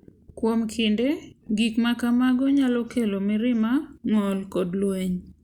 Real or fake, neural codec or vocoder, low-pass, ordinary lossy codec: real; none; 19.8 kHz; Opus, 64 kbps